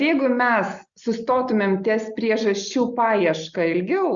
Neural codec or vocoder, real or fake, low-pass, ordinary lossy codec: none; real; 7.2 kHz; Opus, 64 kbps